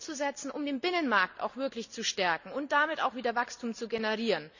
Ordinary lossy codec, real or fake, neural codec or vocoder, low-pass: none; real; none; 7.2 kHz